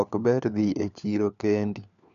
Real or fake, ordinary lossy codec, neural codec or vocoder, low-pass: fake; none; codec, 16 kHz, 4 kbps, FunCodec, trained on LibriTTS, 50 frames a second; 7.2 kHz